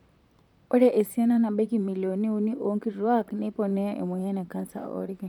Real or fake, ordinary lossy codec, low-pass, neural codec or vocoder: fake; none; 19.8 kHz; vocoder, 44.1 kHz, 128 mel bands, Pupu-Vocoder